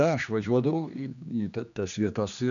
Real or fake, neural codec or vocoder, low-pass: fake; codec, 16 kHz, 2 kbps, X-Codec, HuBERT features, trained on general audio; 7.2 kHz